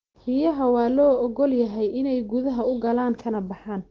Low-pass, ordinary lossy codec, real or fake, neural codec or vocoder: 7.2 kHz; Opus, 16 kbps; real; none